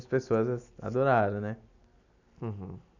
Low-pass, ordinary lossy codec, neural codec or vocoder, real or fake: 7.2 kHz; none; none; real